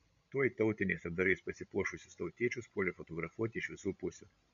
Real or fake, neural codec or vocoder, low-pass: fake; codec, 16 kHz, 16 kbps, FreqCodec, larger model; 7.2 kHz